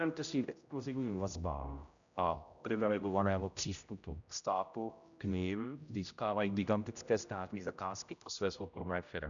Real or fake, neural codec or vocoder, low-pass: fake; codec, 16 kHz, 0.5 kbps, X-Codec, HuBERT features, trained on general audio; 7.2 kHz